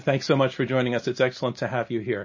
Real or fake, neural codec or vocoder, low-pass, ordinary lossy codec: real; none; 7.2 kHz; MP3, 32 kbps